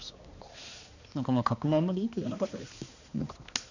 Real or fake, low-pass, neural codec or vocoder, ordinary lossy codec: fake; 7.2 kHz; codec, 16 kHz, 2 kbps, X-Codec, HuBERT features, trained on balanced general audio; none